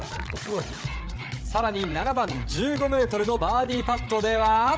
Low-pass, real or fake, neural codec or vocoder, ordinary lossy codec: none; fake; codec, 16 kHz, 8 kbps, FreqCodec, larger model; none